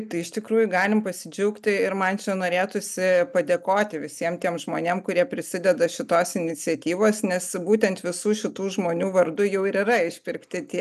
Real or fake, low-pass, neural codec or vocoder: real; 14.4 kHz; none